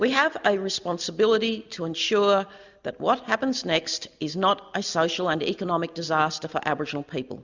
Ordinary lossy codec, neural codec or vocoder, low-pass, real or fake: Opus, 64 kbps; none; 7.2 kHz; real